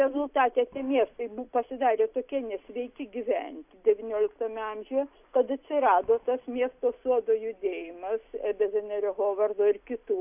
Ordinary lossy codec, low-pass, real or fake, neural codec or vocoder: AAC, 32 kbps; 3.6 kHz; real; none